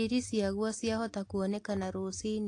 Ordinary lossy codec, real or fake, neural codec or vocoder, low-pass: AAC, 48 kbps; real; none; 10.8 kHz